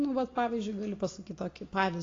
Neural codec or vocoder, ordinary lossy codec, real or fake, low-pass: none; AAC, 32 kbps; real; 7.2 kHz